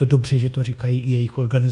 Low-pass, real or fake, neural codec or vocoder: 10.8 kHz; fake; codec, 24 kHz, 1.2 kbps, DualCodec